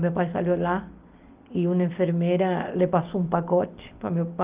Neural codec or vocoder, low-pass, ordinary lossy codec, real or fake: none; 3.6 kHz; Opus, 32 kbps; real